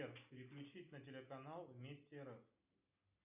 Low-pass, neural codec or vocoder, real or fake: 3.6 kHz; none; real